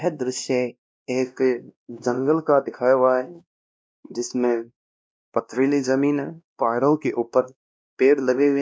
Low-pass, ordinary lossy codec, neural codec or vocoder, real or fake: none; none; codec, 16 kHz, 1 kbps, X-Codec, WavLM features, trained on Multilingual LibriSpeech; fake